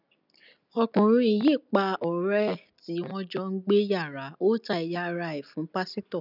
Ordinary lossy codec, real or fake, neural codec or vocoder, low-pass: none; real; none; 5.4 kHz